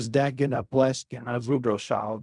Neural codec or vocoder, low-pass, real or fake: codec, 16 kHz in and 24 kHz out, 0.4 kbps, LongCat-Audio-Codec, fine tuned four codebook decoder; 10.8 kHz; fake